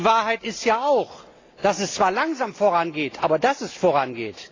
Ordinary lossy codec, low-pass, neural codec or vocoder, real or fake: AAC, 32 kbps; 7.2 kHz; none; real